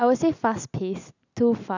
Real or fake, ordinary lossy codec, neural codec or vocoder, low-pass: real; none; none; 7.2 kHz